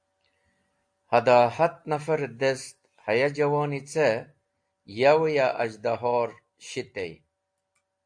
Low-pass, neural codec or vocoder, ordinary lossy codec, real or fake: 9.9 kHz; none; AAC, 64 kbps; real